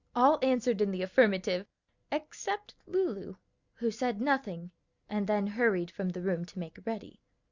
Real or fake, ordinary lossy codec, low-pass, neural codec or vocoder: real; Opus, 64 kbps; 7.2 kHz; none